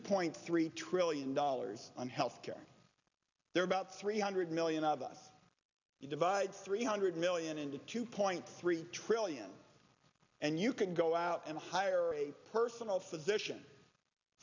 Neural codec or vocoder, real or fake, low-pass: none; real; 7.2 kHz